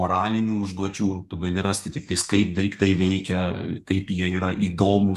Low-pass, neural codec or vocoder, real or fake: 14.4 kHz; codec, 32 kHz, 1.9 kbps, SNAC; fake